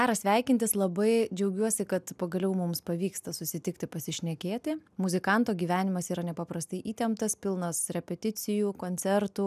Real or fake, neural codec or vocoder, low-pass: real; none; 14.4 kHz